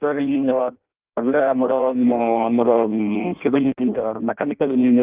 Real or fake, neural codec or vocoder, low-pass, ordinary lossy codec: fake; codec, 16 kHz in and 24 kHz out, 0.6 kbps, FireRedTTS-2 codec; 3.6 kHz; Opus, 24 kbps